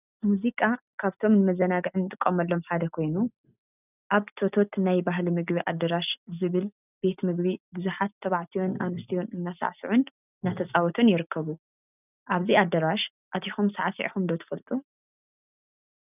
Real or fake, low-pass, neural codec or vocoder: real; 3.6 kHz; none